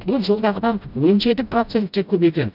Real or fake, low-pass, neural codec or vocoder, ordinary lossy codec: fake; 5.4 kHz; codec, 16 kHz, 0.5 kbps, FreqCodec, smaller model; none